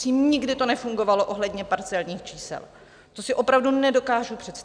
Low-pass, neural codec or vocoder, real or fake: 9.9 kHz; none; real